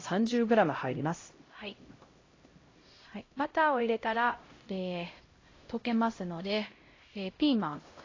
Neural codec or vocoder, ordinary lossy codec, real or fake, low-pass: codec, 16 kHz, 0.5 kbps, X-Codec, HuBERT features, trained on LibriSpeech; AAC, 32 kbps; fake; 7.2 kHz